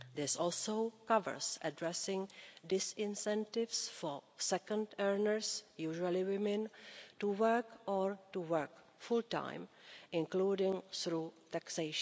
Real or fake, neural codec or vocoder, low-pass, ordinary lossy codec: real; none; none; none